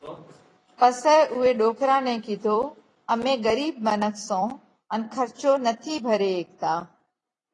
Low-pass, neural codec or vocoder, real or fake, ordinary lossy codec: 10.8 kHz; vocoder, 44.1 kHz, 128 mel bands every 256 samples, BigVGAN v2; fake; AAC, 32 kbps